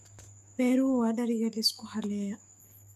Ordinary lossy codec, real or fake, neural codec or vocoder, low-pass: none; fake; codec, 44.1 kHz, 7.8 kbps, DAC; 14.4 kHz